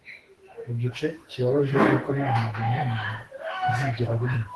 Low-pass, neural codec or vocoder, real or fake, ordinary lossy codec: 10.8 kHz; autoencoder, 48 kHz, 32 numbers a frame, DAC-VAE, trained on Japanese speech; fake; Opus, 16 kbps